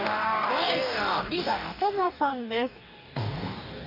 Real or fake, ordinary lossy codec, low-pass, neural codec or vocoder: fake; none; 5.4 kHz; codec, 44.1 kHz, 2.6 kbps, DAC